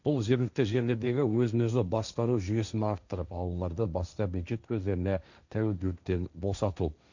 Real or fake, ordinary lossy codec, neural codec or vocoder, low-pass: fake; none; codec, 16 kHz, 1.1 kbps, Voila-Tokenizer; 7.2 kHz